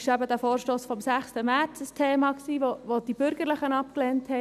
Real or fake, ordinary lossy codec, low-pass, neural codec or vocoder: real; none; 14.4 kHz; none